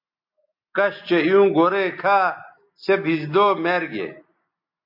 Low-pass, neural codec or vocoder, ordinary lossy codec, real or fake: 5.4 kHz; none; MP3, 48 kbps; real